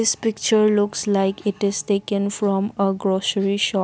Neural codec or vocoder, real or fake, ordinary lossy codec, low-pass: none; real; none; none